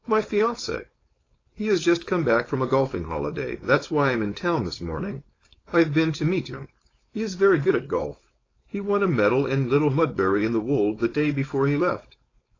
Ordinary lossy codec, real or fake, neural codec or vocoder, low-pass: AAC, 32 kbps; fake; codec, 16 kHz, 4.8 kbps, FACodec; 7.2 kHz